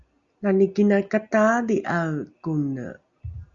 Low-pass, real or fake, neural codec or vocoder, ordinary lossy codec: 7.2 kHz; real; none; Opus, 64 kbps